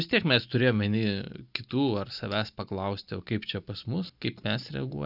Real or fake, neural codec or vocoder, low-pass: real; none; 5.4 kHz